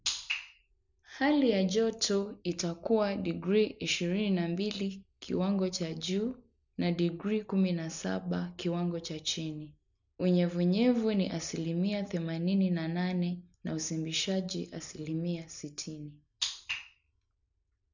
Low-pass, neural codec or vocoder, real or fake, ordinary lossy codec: 7.2 kHz; none; real; none